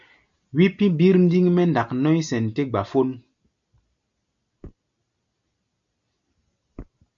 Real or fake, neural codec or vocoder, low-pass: real; none; 7.2 kHz